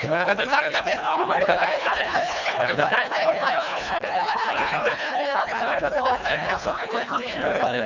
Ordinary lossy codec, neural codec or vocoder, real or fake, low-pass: none; codec, 24 kHz, 1.5 kbps, HILCodec; fake; 7.2 kHz